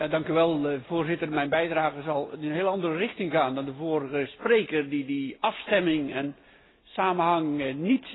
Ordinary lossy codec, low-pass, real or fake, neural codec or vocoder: AAC, 16 kbps; 7.2 kHz; real; none